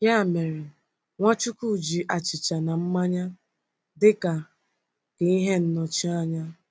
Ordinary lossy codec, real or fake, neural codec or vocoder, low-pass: none; real; none; none